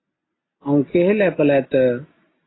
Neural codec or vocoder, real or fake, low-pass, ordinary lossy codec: none; real; 7.2 kHz; AAC, 16 kbps